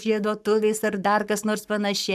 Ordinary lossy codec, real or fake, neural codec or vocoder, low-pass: AAC, 96 kbps; fake; codec, 44.1 kHz, 7.8 kbps, Pupu-Codec; 14.4 kHz